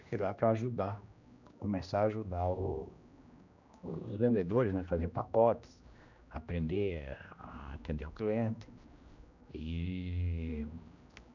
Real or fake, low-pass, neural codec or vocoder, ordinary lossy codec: fake; 7.2 kHz; codec, 16 kHz, 1 kbps, X-Codec, HuBERT features, trained on general audio; none